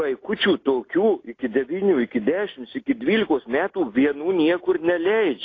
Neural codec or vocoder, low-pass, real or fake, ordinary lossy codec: none; 7.2 kHz; real; AAC, 32 kbps